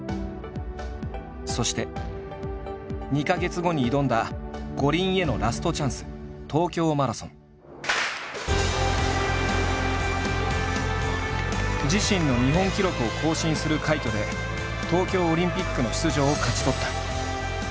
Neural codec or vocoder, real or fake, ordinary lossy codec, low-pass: none; real; none; none